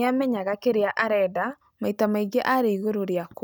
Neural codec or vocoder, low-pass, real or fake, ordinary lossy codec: none; none; real; none